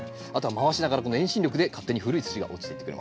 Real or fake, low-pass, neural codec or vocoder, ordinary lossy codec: real; none; none; none